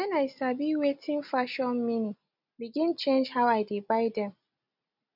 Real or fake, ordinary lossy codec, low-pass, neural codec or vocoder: real; none; 5.4 kHz; none